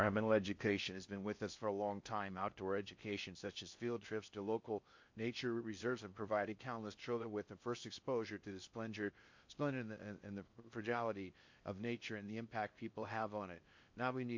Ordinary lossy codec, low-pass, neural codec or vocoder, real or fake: MP3, 48 kbps; 7.2 kHz; codec, 16 kHz in and 24 kHz out, 0.6 kbps, FocalCodec, streaming, 4096 codes; fake